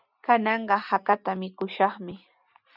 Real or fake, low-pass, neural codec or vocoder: real; 5.4 kHz; none